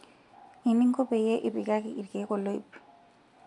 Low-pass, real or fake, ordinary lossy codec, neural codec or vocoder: 10.8 kHz; real; none; none